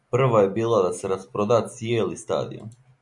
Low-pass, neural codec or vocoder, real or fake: 10.8 kHz; none; real